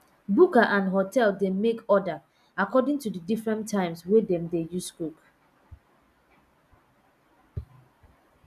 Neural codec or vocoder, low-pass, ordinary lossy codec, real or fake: none; 14.4 kHz; none; real